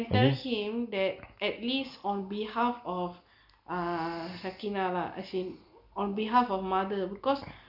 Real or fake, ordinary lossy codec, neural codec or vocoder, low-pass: real; none; none; 5.4 kHz